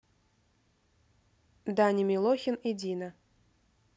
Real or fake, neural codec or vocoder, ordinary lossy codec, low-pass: real; none; none; none